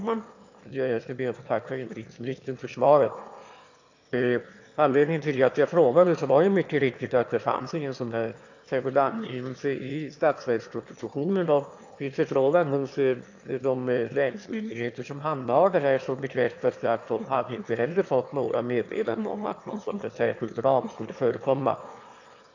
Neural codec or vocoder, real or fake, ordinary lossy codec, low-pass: autoencoder, 22.05 kHz, a latent of 192 numbers a frame, VITS, trained on one speaker; fake; AAC, 48 kbps; 7.2 kHz